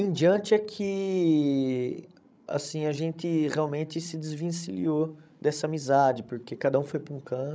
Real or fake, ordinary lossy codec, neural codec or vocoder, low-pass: fake; none; codec, 16 kHz, 16 kbps, FreqCodec, larger model; none